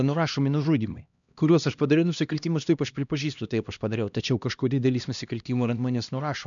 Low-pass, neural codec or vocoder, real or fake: 7.2 kHz; codec, 16 kHz, 1 kbps, X-Codec, HuBERT features, trained on LibriSpeech; fake